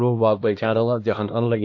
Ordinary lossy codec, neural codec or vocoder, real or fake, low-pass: AAC, 48 kbps; codec, 16 kHz, 1 kbps, X-Codec, HuBERT features, trained on LibriSpeech; fake; 7.2 kHz